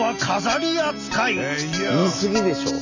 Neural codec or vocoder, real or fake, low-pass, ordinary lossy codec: none; real; 7.2 kHz; none